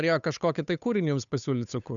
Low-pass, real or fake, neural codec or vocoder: 7.2 kHz; fake; codec, 16 kHz, 8 kbps, FunCodec, trained on Chinese and English, 25 frames a second